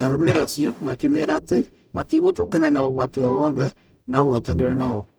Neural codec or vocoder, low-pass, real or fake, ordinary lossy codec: codec, 44.1 kHz, 0.9 kbps, DAC; none; fake; none